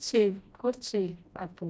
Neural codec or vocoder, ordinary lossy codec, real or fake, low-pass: codec, 16 kHz, 1 kbps, FreqCodec, smaller model; none; fake; none